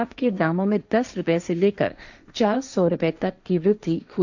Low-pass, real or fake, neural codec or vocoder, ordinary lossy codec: 7.2 kHz; fake; codec, 16 kHz, 1.1 kbps, Voila-Tokenizer; none